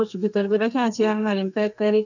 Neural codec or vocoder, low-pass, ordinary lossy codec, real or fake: codec, 32 kHz, 1.9 kbps, SNAC; 7.2 kHz; none; fake